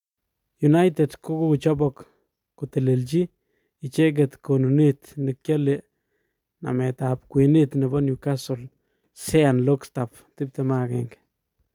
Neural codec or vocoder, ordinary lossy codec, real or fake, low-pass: none; none; real; 19.8 kHz